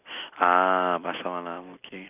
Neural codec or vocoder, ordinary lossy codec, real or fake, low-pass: none; none; real; 3.6 kHz